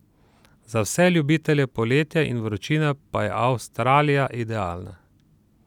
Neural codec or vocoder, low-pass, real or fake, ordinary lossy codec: none; 19.8 kHz; real; none